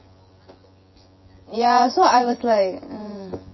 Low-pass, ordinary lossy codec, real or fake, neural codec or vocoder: 7.2 kHz; MP3, 24 kbps; fake; vocoder, 24 kHz, 100 mel bands, Vocos